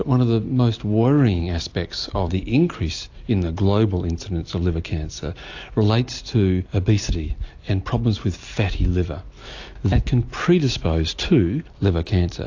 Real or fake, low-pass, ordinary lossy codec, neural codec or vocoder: real; 7.2 kHz; AAC, 48 kbps; none